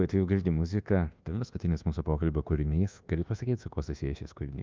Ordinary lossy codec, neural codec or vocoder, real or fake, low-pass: Opus, 24 kbps; codec, 24 kHz, 1.2 kbps, DualCodec; fake; 7.2 kHz